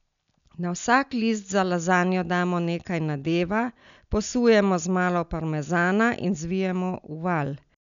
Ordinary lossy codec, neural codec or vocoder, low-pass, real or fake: none; none; 7.2 kHz; real